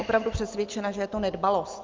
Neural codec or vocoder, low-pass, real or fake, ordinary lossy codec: none; 7.2 kHz; real; Opus, 24 kbps